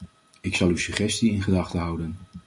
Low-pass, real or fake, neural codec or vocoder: 10.8 kHz; real; none